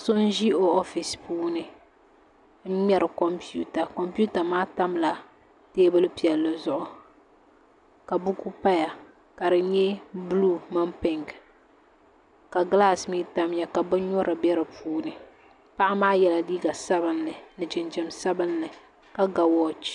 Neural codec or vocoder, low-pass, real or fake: none; 10.8 kHz; real